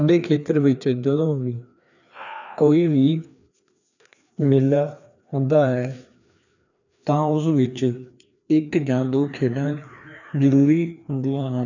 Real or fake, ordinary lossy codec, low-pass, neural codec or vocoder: fake; none; 7.2 kHz; codec, 16 kHz, 2 kbps, FreqCodec, larger model